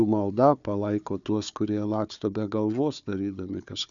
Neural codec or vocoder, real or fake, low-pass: codec, 16 kHz, 4 kbps, FunCodec, trained on Chinese and English, 50 frames a second; fake; 7.2 kHz